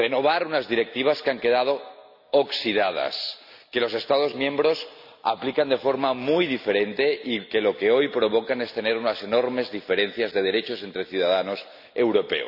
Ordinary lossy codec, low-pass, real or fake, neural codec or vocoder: none; 5.4 kHz; real; none